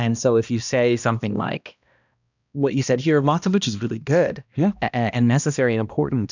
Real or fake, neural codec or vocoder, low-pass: fake; codec, 16 kHz, 1 kbps, X-Codec, HuBERT features, trained on balanced general audio; 7.2 kHz